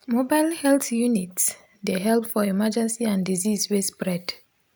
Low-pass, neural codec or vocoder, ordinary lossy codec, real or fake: none; none; none; real